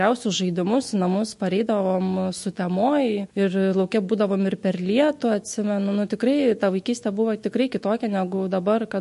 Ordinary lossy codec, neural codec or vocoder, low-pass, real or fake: MP3, 48 kbps; autoencoder, 48 kHz, 128 numbers a frame, DAC-VAE, trained on Japanese speech; 14.4 kHz; fake